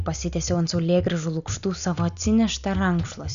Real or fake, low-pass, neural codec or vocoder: real; 7.2 kHz; none